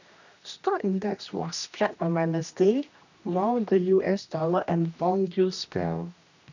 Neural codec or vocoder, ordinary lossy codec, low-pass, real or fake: codec, 16 kHz, 1 kbps, X-Codec, HuBERT features, trained on general audio; none; 7.2 kHz; fake